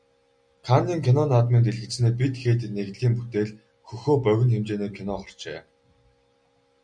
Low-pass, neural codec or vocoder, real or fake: 9.9 kHz; none; real